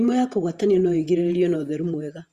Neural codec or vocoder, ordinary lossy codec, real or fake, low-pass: vocoder, 48 kHz, 128 mel bands, Vocos; Opus, 64 kbps; fake; 14.4 kHz